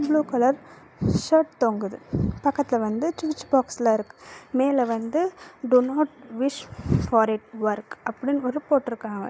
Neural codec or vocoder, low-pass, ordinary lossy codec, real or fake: none; none; none; real